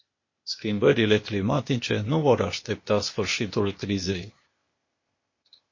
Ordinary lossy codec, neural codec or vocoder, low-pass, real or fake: MP3, 32 kbps; codec, 16 kHz, 0.8 kbps, ZipCodec; 7.2 kHz; fake